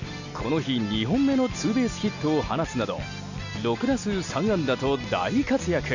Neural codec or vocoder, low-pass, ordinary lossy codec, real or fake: none; 7.2 kHz; none; real